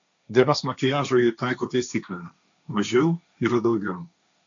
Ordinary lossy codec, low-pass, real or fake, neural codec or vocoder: AAC, 64 kbps; 7.2 kHz; fake; codec, 16 kHz, 1.1 kbps, Voila-Tokenizer